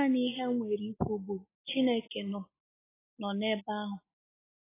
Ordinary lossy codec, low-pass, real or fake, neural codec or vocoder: AAC, 16 kbps; 3.6 kHz; real; none